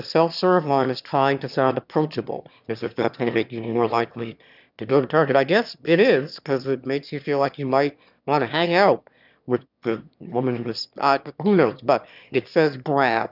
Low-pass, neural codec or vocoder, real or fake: 5.4 kHz; autoencoder, 22.05 kHz, a latent of 192 numbers a frame, VITS, trained on one speaker; fake